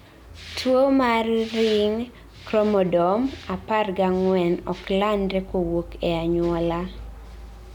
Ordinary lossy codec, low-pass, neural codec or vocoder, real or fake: none; 19.8 kHz; none; real